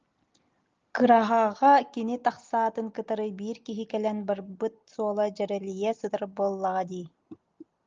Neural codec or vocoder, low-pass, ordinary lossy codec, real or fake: none; 7.2 kHz; Opus, 24 kbps; real